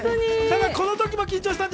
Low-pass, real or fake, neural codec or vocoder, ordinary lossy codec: none; real; none; none